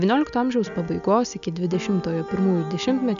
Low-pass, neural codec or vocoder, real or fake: 7.2 kHz; none; real